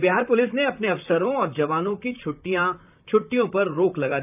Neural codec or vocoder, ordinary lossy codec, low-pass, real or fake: vocoder, 44.1 kHz, 128 mel bands, Pupu-Vocoder; none; 3.6 kHz; fake